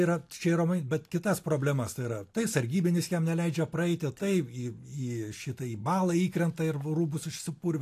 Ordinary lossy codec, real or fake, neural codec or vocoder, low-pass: AAC, 64 kbps; real; none; 14.4 kHz